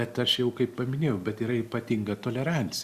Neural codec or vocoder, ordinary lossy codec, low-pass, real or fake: none; Opus, 64 kbps; 14.4 kHz; real